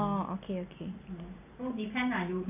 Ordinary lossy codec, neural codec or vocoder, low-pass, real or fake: none; none; 3.6 kHz; real